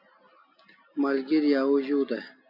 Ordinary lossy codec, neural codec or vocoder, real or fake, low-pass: AAC, 48 kbps; none; real; 5.4 kHz